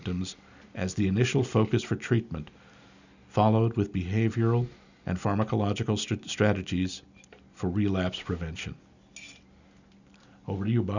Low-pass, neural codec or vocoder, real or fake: 7.2 kHz; none; real